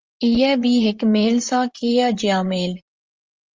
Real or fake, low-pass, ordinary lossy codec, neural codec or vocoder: fake; 7.2 kHz; Opus, 32 kbps; vocoder, 44.1 kHz, 128 mel bands, Pupu-Vocoder